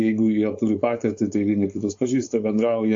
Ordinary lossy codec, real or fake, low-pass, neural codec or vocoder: AAC, 48 kbps; fake; 7.2 kHz; codec, 16 kHz, 4.8 kbps, FACodec